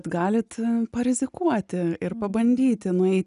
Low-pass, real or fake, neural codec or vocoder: 10.8 kHz; real; none